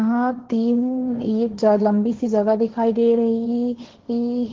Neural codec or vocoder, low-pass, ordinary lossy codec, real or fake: codec, 16 kHz, 1.1 kbps, Voila-Tokenizer; 7.2 kHz; Opus, 16 kbps; fake